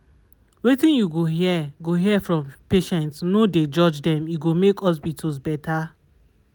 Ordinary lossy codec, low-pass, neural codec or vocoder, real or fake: none; none; none; real